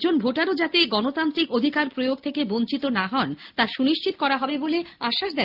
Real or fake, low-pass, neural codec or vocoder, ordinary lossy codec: real; 5.4 kHz; none; Opus, 24 kbps